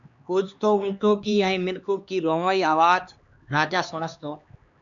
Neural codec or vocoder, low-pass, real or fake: codec, 16 kHz, 2 kbps, X-Codec, HuBERT features, trained on LibriSpeech; 7.2 kHz; fake